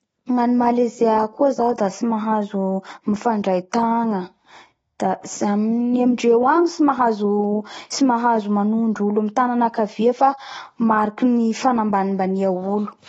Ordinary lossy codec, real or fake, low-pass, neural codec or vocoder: AAC, 24 kbps; real; 19.8 kHz; none